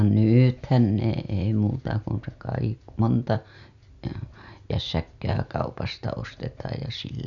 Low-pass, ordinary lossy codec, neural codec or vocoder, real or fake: 7.2 kHz; none; none; real